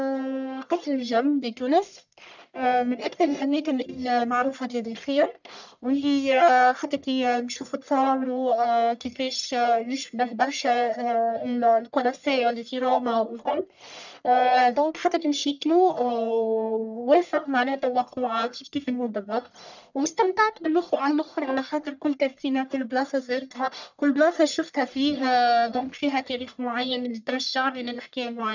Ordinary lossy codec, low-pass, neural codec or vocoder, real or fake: none; 7.2 kHz; codec, 44.1 kHz, 1.7 kbps, Pupu-Codec; fake